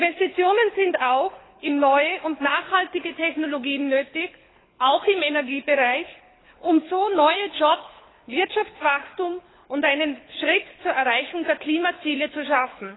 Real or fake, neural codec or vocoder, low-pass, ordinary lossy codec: fake; codec, 24 kHz, 6 kbps, HILCodec; 7.2 kHz; AAC, 16 kbps